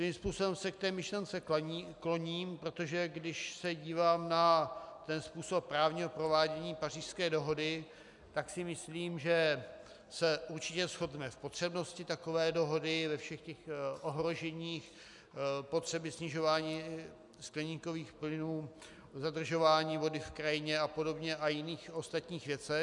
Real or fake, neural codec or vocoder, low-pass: real; none; 10.8 kHz